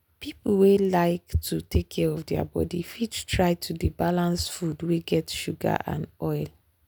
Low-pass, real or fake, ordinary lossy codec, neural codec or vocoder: none; real; none; none